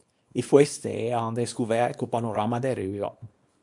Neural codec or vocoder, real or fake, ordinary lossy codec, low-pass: codec, 24 kHz, 0.9 kbps, WavTokenizer, small release; fake; MP3, 64 kbps; 10.8 kHz